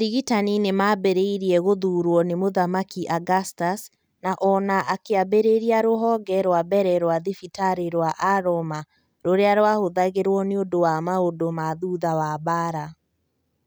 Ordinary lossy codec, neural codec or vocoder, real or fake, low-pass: none; none; real; none